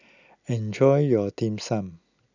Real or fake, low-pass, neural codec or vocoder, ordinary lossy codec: real; 7.2 kHz; none; none